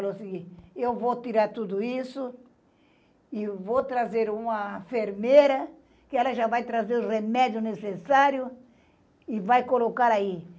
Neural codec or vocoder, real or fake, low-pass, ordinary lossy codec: none; real; none; none